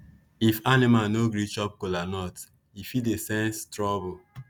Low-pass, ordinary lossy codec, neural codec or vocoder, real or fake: none; none; none; real